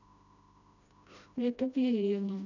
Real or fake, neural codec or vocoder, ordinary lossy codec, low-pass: fake; codec, 16 kHz, 1 kbps, FreqCodec, smaller model; none; 7.2 kHz